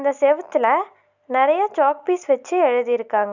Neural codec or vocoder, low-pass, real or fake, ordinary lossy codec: none; 7.2 kHz; real; none